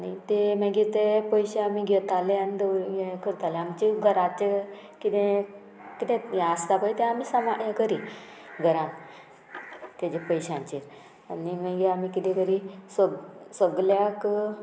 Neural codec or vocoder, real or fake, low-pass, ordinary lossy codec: none; real; none; none